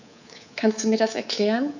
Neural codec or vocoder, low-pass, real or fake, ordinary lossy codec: codec, 24 kHz, 3.1 kbps, DualCodec; 7.2 kHz; fake; none